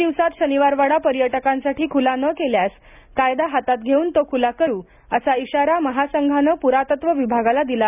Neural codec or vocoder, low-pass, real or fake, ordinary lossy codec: none; 3.6 kHz; real; none